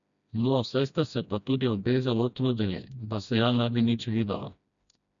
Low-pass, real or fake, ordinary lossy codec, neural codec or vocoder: 7.2 kHz; fake; MP3, 96 kbps; codec, 16 kHz, 1 kbps, FreqCodec, smaller model